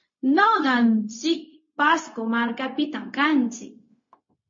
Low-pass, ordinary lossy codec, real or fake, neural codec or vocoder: 7.2 kHz; MP3, 32 kbps; fake; codec, 16 kHz, 0.4 kbps, LongCat-Audio-Codec